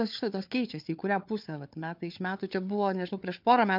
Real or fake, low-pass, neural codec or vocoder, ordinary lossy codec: fake; 5.4 kHz; codec, 16 kHz, 4 kbps, FunCodec, trained on Chinese and English, 50 frames a second; MP3, 48 kbps